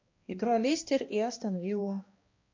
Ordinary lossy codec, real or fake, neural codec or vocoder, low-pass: MP3, 48 kbps; fake; codec, 16 kHz, 1 kbps, X-Codec, HuBERT features, trained on balanced general audio; 7.2 kHz